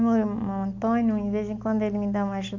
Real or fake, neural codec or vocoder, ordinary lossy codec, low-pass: real; none; MP3, 64 kbps; 7.2 kHz